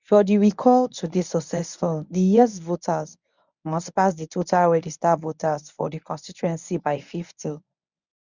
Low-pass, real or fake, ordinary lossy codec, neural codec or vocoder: 7.2 kHz; fake; none; codec, 24 kHz, 0.9 kbps, WavTokenizer, medium speech release version 1